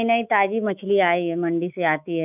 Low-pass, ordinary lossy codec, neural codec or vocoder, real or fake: 3.6 kHz; none; autoencoder, 48 kHz, 128 numbers a frame, DAC-VAE, trained on Japanese speech; fake